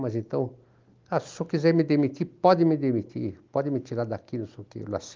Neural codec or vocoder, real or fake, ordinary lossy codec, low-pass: none; real; Opus, 32 kbps; 7.2 kHz